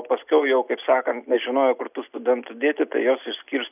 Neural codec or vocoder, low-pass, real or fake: none; 3.6 kHz; real